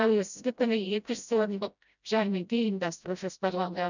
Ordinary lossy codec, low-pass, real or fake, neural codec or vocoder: none; 7.2 kHz; fake; codec, 16 kHz, 0.5 kbps, FreqCodec, smaller model